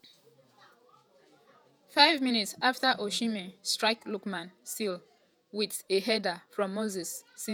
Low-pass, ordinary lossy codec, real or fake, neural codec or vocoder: none; none; fake; vocoder, 48 kHz, 128 mel bands, Vocos